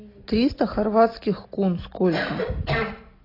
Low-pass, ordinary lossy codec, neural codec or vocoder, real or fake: 5.4 kHz; AAC, 24 kbps; none; real